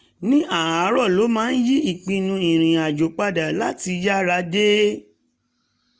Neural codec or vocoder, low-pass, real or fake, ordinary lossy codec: none; none; real; none